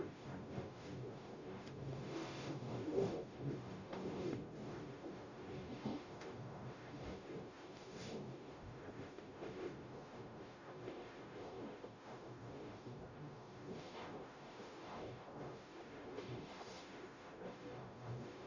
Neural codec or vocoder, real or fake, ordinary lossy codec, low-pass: codec, 44.1 kHz, 0.9 kbps, DAC; fake; none; 7.2 kHz